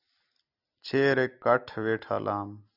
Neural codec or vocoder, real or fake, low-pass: none; real; 5.4 kHz